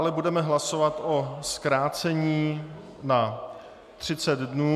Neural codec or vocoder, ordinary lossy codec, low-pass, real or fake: none; MP3, 96 kbps; 14.4 kHz; real